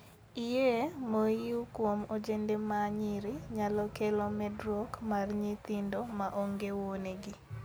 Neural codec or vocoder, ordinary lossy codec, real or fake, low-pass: none; none; real; none